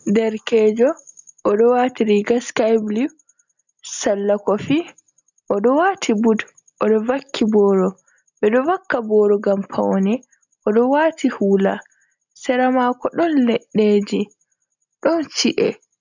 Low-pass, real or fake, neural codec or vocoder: 7.2 kHz; real; none